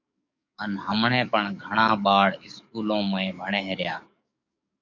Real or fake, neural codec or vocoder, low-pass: fake; codec, 44.1 kHz, 7.8 kbps, DAC; 7.2 kHz